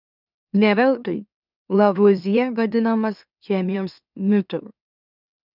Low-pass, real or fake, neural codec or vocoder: 5.4 kHz; fake; autoencoder, 44.1 kHz, a latent of 192 numbers a frame, MeloTTS